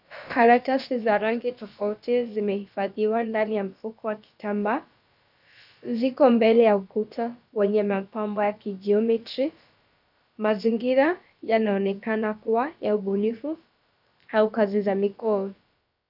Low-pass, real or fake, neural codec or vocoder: 5.4 kHz; fake; codec, 16 kHz, about 1 kbps, DyCAST, with the encoder's durations